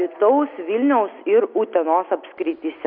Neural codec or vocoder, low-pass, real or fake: none; 5.4 kHz; real